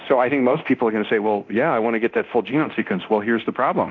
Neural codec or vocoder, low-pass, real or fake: codec, 24 kHz, 0.9 kbps, DualCodec; 7.2 kHz; fake